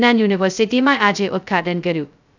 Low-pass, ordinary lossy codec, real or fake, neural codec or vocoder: 7.2 kHz; none; fake; codec, 16 kHz, 0.2 kbps, FocalCodec